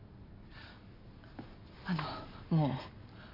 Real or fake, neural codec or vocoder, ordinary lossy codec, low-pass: real; none; MP3, 32 kbps; 5.4 kHz